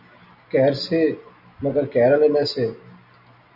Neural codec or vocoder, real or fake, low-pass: none; real; 5.4 kHz